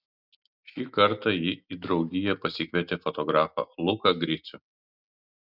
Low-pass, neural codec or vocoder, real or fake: 5.4 kHz; none; real